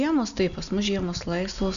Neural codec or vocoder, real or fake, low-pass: none; real; 7.2 kHz